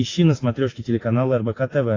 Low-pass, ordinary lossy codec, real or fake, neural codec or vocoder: 7.2 kHz; AAC, 32 kbps; real; none